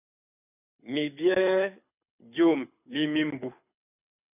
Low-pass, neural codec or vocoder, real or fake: 3.6 kHz; codec, 24 kHz, 6 kbps, HILCodec; fake